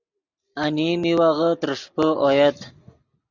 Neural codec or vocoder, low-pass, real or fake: none; 7.2 kHz; real